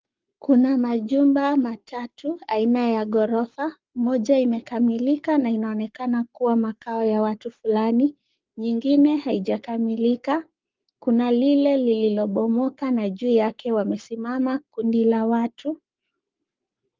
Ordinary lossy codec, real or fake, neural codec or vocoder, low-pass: Opus, 32 kbps; fake; codec, 44.1 kHz, 7.8 kbps, Pupu-Codec; 7.2 kHz